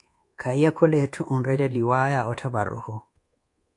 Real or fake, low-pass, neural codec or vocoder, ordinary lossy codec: fake; 10.8 kHz; codec, 24 kHz, 1.2 kbps, DualCodec; AAC, 48 kbps